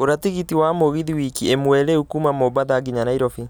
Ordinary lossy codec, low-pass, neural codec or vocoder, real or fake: none; none; none; real